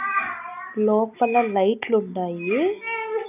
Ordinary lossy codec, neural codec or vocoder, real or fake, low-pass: none; none; real; 3.6 kHz